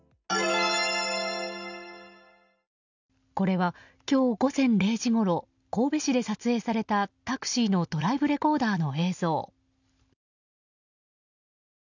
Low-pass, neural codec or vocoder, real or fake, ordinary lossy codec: 7.2 kHz; none; real; none